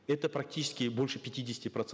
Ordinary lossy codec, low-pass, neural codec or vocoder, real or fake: none; none; none; real